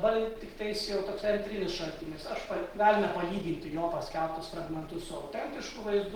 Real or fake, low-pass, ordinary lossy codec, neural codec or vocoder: real; 19.8 kHz; Opus, 16 kbps; none